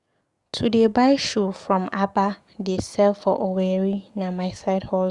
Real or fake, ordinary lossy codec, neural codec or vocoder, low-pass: fake; Opus, 64 kbps; codec, 44.1 kHz, 7.8 kbps, DAC; 10.8 kHz